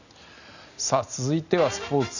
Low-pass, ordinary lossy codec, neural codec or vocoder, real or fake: 7.2 kHz; none; none; real